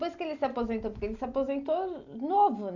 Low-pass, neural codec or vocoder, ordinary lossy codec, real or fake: 7.2 kHz; none; none; real